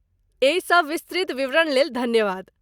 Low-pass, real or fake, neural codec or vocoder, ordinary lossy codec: 19.8 kHz; real; none; Opus, 64 kbps